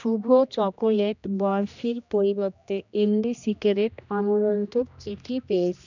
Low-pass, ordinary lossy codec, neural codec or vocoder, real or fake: 7.2 kHz; none; codec, 16 kHz, 1 kbps, X-Codec, HuBERT features, trained on general audio; fake